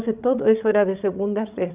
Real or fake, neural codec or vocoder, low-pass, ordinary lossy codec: fake; codec, 16 kHz, 16 kbps, FreqCodec, larger model; 3.6 kHz; Opus, 64 kbps